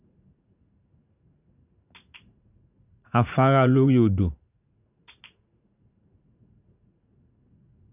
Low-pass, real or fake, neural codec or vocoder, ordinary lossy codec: 3.6 kHz; fake; vocoder, 44.1 kHz, 80 mel bands, Vocos; none